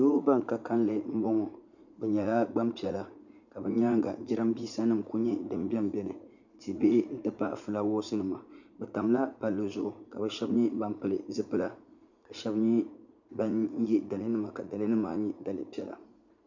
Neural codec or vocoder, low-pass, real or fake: vocoder, 44.1 kHz, 80 mel bands, Vocos; 7.2 kHz; fake